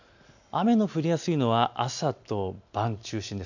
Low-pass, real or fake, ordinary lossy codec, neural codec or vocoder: 7.2 kHz; real; none; none